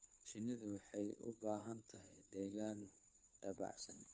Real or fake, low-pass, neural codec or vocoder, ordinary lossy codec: fake; none; codec, 16 kHz, 2 kbps, FunCodec, trained on Chinese and English, 25 frames a second; none